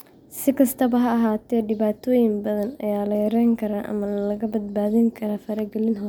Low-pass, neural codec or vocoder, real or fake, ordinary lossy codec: none; none; real; none